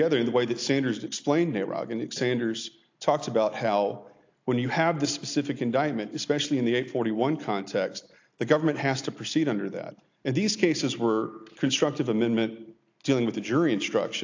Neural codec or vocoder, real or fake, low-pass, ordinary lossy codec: none; real; 7.2 kHz; AAC, 48 kbps